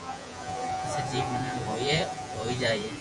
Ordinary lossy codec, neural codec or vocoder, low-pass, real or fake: Opus, 64 kbps; vocoder, 48 kHz, 128 mel bands, Vocos; 10.8 kHz; fake